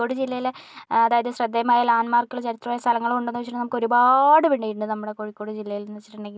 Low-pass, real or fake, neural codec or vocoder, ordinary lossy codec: none; real; none; none